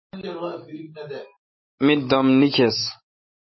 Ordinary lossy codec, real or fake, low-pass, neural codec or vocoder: MP3, 24 kbps; fake; 7.2 kHz; autoencoder, 48 kHz, 128 numbers a frame, DAC-VAE, trained on Japanese speech